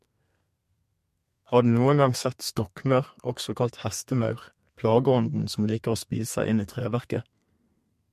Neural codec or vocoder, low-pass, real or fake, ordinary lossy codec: codec, 32 kHz, 1.9 kbps, SNAC; 14.4 kHz; fake; MP3, 64 kbps